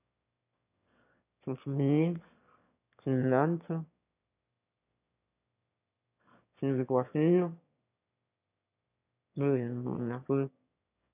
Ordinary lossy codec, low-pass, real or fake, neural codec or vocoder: none; 3.6 kHz; fake; autoencoder, 22.05 kHz, a latent of 192 numbers a frame, VITS, trained on one speaker